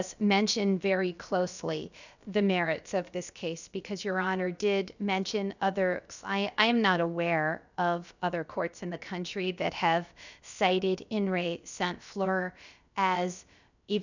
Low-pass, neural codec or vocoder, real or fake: 7.2 kHz; codec, 16 kHz, about 1 kbps, DyCAST, with the encoder's durations; fake